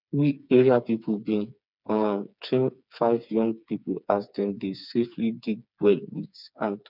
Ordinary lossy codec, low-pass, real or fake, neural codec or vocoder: none; 5.4 kHz; fake; codec, 16 kHz, 4 kbps, FreqCodec, smaller model